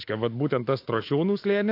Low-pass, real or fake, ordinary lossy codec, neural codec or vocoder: 5.4 kHz; fake; AAC, 32 kbps; autoencoder, 48 kHz, 128 numbers a frame, DAC-VAE, trained on Japanese speech